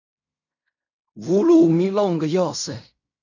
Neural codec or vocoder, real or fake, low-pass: codec, 16 kHz in and 24 kHz out, 0.4 kbps, LongCat-Audio-Codec, fine tuned four codebook decoder; fake; 7.2 kHz